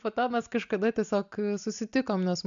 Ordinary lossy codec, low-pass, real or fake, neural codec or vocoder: MP3, 64 kbps; 7.2 kHz; real; none